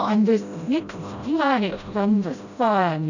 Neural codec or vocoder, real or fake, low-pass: codec, 16 kHz, 0.5 kbps, FreqCodec, smaller model; fake; 7.2 kHz